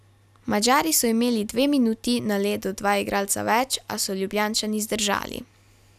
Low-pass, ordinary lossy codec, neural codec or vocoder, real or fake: 14.4 kHz; none; none; real